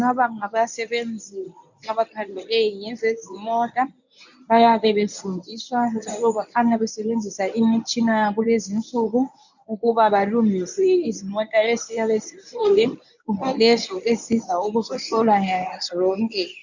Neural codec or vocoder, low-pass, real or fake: codec, 24 kHz, 0.9 kbps, WavTokenizer, medium speech release version 1; 7.2 kHz; fake